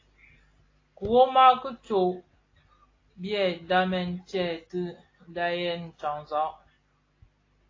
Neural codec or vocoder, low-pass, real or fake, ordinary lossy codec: none; 7.2 kHz; real; AAC, 32 kbps